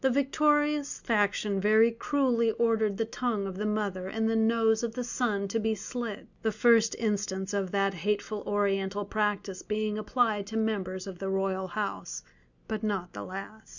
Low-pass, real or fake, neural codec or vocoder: 7.2 kHz; real; none